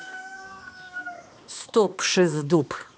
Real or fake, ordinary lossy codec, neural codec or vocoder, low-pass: fake; none; codec, 16 kHz, 2 kbps, X-Codec, HuBERT features, trained on balanced general audio; none